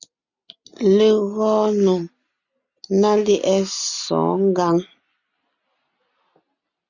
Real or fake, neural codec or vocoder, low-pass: real; none; 7.2 kHz